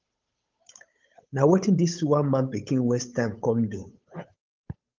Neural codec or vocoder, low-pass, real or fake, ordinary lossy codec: codec, 16 kHz, 8 kbps, FunCodec, trained on Chinese and English, 25 frames a second; 7.2 kHz; fake; Opus, 24 kbps